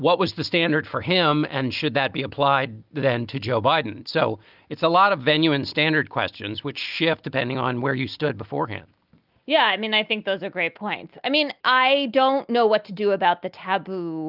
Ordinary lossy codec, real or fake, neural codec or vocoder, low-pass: Opus, 24 kbps; real; none; 5.4 kHz